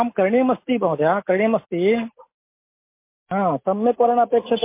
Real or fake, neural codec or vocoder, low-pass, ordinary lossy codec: real; none; 3.6 kHz; MP3, 24 kbps